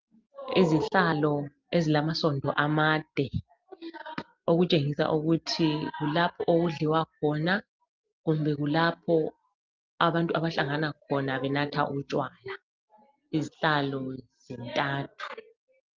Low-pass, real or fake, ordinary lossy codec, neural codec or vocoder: 7.2 kHz; real; Opus, 32 kbps; none